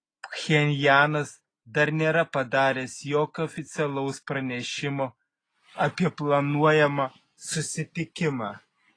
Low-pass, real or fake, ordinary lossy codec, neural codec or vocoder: 9.9 kHz; real; AAC, 32 kbps; none